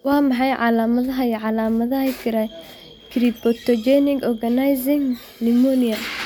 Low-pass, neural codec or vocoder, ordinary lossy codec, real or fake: none; none; none; real